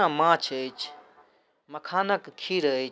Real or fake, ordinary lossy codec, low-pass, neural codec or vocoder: real; none; none; none